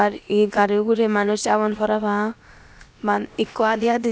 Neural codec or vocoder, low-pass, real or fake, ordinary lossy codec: codec, 16 kHz, about 1 kbps, DyCAST, with the encoder's durations; none; fake; none